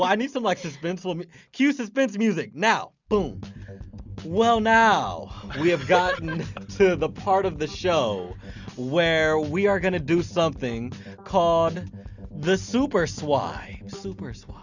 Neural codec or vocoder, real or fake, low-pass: none; real; 7.2 kHz